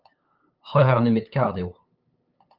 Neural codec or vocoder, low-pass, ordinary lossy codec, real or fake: codec, 16 kHz, 8 kbps, FunCodec, trained on LibriTTS, 25 frames a second; 5.4 kHz; Opus, 24 kbps; fake